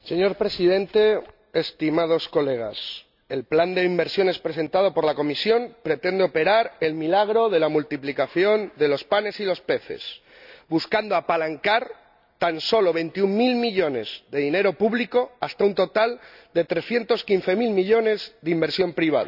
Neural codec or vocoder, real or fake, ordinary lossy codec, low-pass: none; real; none; 5.4 kHz